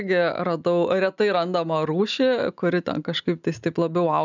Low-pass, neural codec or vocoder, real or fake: 7.2 kHz; none; real